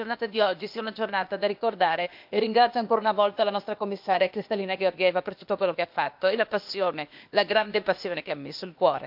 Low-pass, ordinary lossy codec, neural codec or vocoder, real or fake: 5.4 kHz; MP3, 48 kbps; codec, 16 kHz, 0.8 kbps, ZipCodec; fake